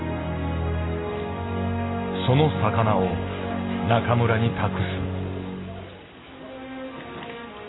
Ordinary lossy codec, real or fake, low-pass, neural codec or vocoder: AAC, 16 kbps; real; 7.2 kHz; none